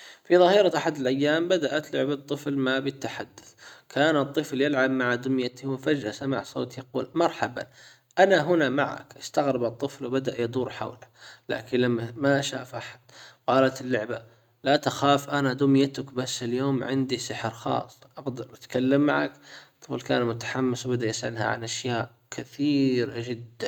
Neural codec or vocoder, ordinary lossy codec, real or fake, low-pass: none; none; real; 19.8 kHz